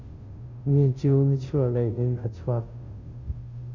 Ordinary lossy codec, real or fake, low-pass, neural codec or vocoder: Opus, 64 kbps; fake; 7.2 kHz; codec, 16 kHz, 0.5 kbps, FunCodec, trained on Chinese and English, 25 frames a second